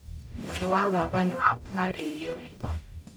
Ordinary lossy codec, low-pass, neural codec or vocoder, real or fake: none; none; codec, 44.1 kHz, 0.9 kbps, DAC; fake